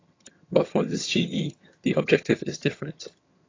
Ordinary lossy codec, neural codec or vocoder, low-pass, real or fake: AAC, 32 kbps; vocoder, 22.05 kHz, 80 mel bands, HiFi-GAN; 7.2 kHz; fake